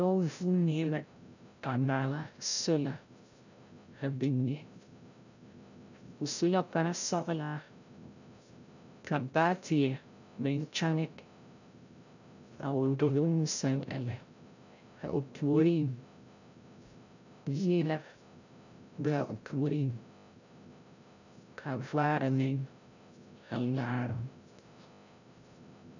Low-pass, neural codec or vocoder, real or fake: 7.2 kHz; codec, 16 kHz, 0.5 kbps, FreqCodec, larger model; fake